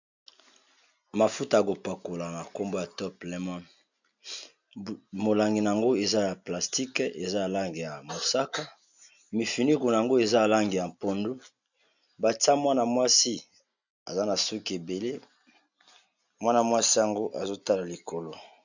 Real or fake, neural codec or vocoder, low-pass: real; none; 7.2 kHz